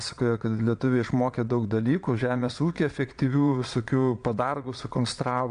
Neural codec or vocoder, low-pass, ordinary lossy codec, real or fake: vocoder, 22.05 kHz, 80 mel bands, Vocos; 9.9 kHz; MP3, 64 kbps; fake